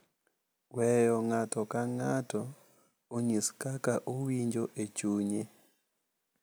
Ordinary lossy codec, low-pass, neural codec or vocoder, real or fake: none; none; none; real